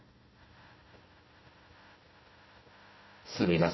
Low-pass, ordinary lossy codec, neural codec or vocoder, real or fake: 7.2 kHz; MP3, 24 kbps; codec, 16 kHz, 1 kbps, FunCodec, trained on Chinese and English, 50 frames a second; fake